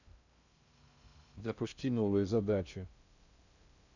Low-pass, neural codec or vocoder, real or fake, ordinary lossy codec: 7.2 kHz; codec, 16 kHz in and 24 kHz out, 0.6 kbps, FocalCodec, streaming, 2048 codes; fake; none